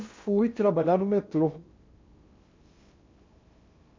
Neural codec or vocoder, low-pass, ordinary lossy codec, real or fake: codec, 16 kHz in and 24 kHz out, 0.8 kbps, FocalCodec, streaming, 65536 codes; 7.2 kHz; MP3, 48 kbps; fake